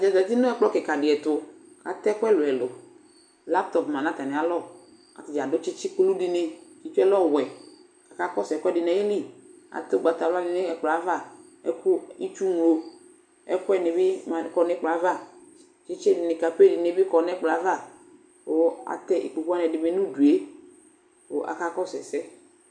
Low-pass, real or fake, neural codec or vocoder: 9.9 kHz; real; none